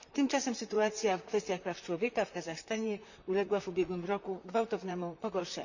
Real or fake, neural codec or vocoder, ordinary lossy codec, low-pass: fake; vocoder, 44.1 kHz, 128 mel bands, Pupu-Vocoder; none; 7.2 kHz